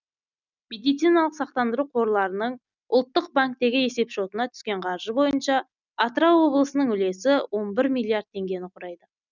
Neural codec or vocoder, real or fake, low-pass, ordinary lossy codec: none; real; 7.2 kHz; none